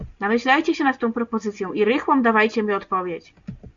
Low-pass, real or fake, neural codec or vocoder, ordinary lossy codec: 7.2 kHz; real; none; Opus, 64 kbps